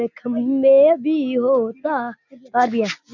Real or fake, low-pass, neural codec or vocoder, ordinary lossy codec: real; 7.2 kHz; none; none